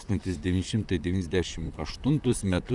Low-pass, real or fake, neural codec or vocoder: 10.8 kHz; fake; vocoder, 44.1 kHz, 128 mel bands, Pupu-Vocoder